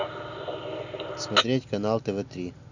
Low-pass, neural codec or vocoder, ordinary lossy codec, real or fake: 7.2 kHz; none; none; real